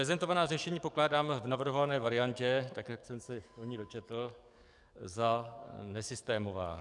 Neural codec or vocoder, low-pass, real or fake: codec, 44.1 kHz, 7.8 kbps, DAC; 10.8 kHz; fake